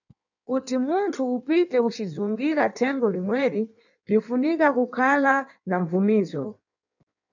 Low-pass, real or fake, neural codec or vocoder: 7.2 kHz; fake; codec, 16 kHz in and 24 kHz out, 1.1 kbps, FireRedTTS-2 codec